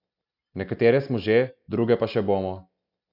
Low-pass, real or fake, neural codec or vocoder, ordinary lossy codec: 5.4 kHz; real; none; none